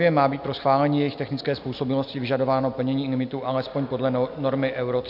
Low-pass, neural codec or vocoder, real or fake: 5.4 kHz; none; real